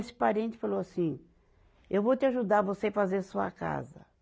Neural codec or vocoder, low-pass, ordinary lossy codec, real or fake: none; none; none; real